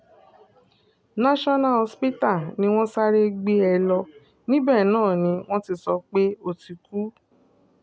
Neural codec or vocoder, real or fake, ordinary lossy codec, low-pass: none; real; none; none